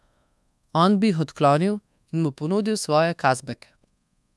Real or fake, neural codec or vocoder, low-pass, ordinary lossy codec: fake; codec, 24 kHz, 1.2 kbps, DualCodec; none; none